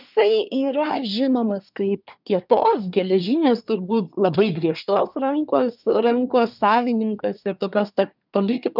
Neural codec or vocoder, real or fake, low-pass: codec, 24 kHz, 1 kbps, SNAC; fake; 5.4 kHz